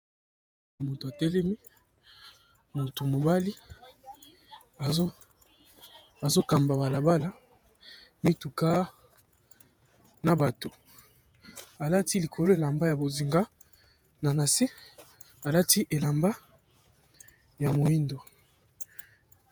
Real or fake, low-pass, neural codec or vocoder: fake; 19.8 kHz; vocoder, 48 kHz, 128 mel bands, Vocos